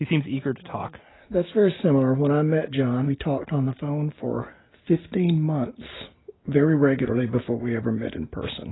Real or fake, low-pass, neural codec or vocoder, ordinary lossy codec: real; 7.2 kHz; none; AAC, 16 kbps